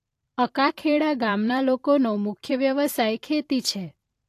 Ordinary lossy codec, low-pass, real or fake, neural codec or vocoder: AAC, 64 kbps; 14.4 kHz; fake; vocoder, 44.1 kHz, 128 mel bands every 512 samples, BigVGAN v2